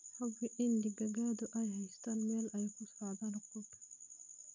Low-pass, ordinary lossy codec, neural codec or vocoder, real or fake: 7.2 kHz; none; none; real